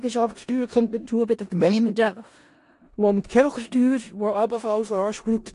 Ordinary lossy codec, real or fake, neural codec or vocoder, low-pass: AAC, 48 kbps; fake; codec, 16 kHz in and 24 kHz out, 0.4 kbps, LongCat-Audio-Codec, four codebook decoder; 10.8 kHz